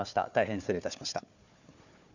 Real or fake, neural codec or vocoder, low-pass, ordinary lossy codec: fake; codec, 16 kHz, 4 kbps, FunCodec, trained on Chinese and English, 50 frames a second; 7.2 kHz; none